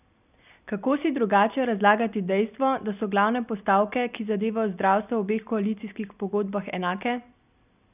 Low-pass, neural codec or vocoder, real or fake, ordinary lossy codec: 3.6 kHz; none; real; none